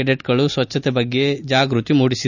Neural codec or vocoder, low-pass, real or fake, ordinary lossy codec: none; 7.2 kHz; real; none